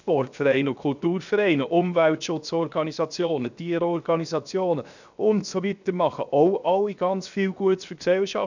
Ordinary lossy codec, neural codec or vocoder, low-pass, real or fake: none; codec, 16 kHz, 0.7 kbps, FocalCodec; 7.2 kHz; fake